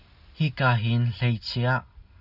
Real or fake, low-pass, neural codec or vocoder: real; 5.4 kHz; none